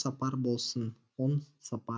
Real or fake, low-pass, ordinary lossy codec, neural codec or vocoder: real; none; none; none